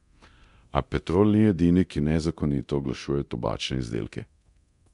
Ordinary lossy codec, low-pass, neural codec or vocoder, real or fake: none; 10.8 kHz; codec, 24 kHz, 0.9 kbps, DualCodec; fake